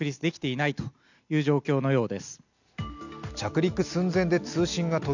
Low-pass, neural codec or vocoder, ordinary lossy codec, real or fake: 7.2 kHz; none; none; real